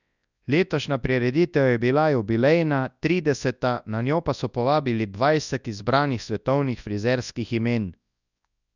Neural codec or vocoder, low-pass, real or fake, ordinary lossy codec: codec, 24 kHz, 0.9 kbps, WavTokenizer, large speech release; 7.2 kHz; fake; none